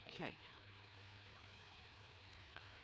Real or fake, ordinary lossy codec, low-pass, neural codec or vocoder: fake; none; none; codec, 16 kHz, 2 kbps, FreqCodec, larger model